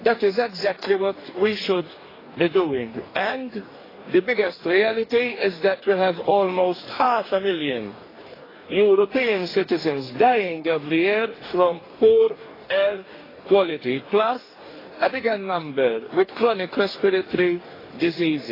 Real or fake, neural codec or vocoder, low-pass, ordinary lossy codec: fake; codec, 44.1 kHz, 2.6 kbps, DAC; 5.4 kHz; AAC, 24 kbps